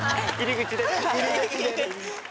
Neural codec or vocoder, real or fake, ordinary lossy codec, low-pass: none; real; none; none